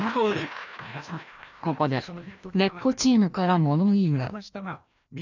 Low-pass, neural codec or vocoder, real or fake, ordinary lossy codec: 7.2 kHz; codec, 16 kHz, 1 kbps, FreqCodec, larger model; fake; none